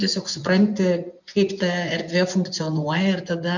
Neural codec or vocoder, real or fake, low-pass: vocoder, 24 kHz, 100 mel bands, Vocos; fake; 7.2 kHz